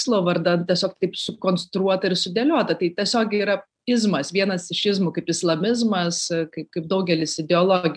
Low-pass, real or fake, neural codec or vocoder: 9.9 kHz; real; none